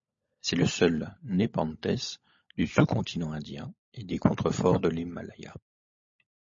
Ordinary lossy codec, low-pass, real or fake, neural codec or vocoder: MP3, 32 kbps; 7.2 kHz; fake; codec, 16 kHz, 16 kbps, FunCodec, trained on LibriTTS, 50 frames a second